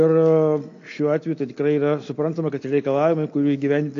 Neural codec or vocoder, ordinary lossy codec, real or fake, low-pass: none; AAC, 48 kbps; real; 7.2 kHz